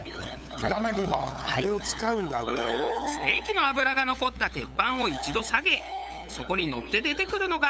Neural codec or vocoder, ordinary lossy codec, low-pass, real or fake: codec, 16 kHz, 8 kbps, FunCodec, trained on LibriTTS, 25 frames a second; none; none; fake